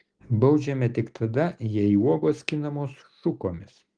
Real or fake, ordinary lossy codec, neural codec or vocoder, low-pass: real; Opus, 24 kbps; none; 9.9 kHz